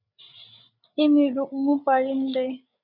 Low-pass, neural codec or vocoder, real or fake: 5.4 kHz; codec, 16 kHz, 8 kbps, FreqCodec, larger model; fake